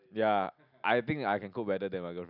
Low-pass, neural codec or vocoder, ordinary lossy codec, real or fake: 5.4 kHz; none; none; real